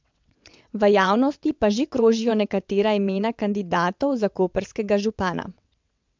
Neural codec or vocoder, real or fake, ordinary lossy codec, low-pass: vocoder, 22.05 kHz, 80 mel bands, WaveNeXt; fake; MP3, 64 kbps; 7.2 kHz